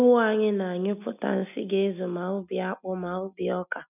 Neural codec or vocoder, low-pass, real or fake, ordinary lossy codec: none; 3.6 kHz; real; none